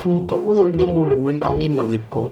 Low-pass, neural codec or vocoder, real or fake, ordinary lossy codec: 19.8 kHz; codec, 44.1 kHz, 0.9 kbps, DAC; fake; none